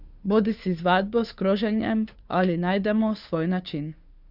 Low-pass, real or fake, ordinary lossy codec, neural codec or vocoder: 5.4 kHz; fake; Opus, 64 kbps; codec, 16 kHz, 2 kbps, FunCodec, trained on Chinese and English, 25 frames a second